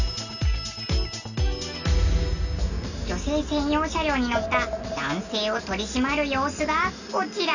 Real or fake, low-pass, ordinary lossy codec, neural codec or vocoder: real; 7.2 kHz; none; none